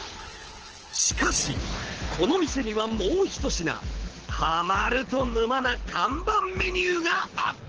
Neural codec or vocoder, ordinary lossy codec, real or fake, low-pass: codec, 24 kHz, 6 kbps, HILCodec; Opus, 16 kbps; fake; 7.2 kHz